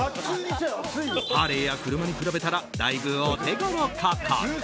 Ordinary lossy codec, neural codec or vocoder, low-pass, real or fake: none; none; none; real